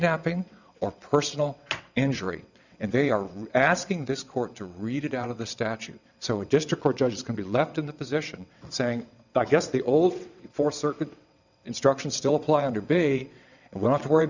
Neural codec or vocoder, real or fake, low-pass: vocoder, 22.05 kHz, 80 mel bands, WaveNeXt; fake; 7.2 kHz